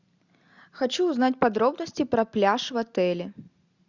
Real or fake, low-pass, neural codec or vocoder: real; 7.2 kHz; none